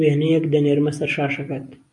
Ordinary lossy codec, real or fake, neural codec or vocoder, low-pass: MP3, 64 kbps; real; none; 10.8 kHz